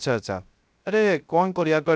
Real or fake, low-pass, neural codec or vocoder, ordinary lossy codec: fake; none; codec, 16 kHz, 0.3 kbps, FocalCodec; none